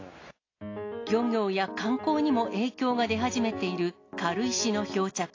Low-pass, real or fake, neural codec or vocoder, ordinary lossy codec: 7.2 kHz; real; none; AAC, 32 kbps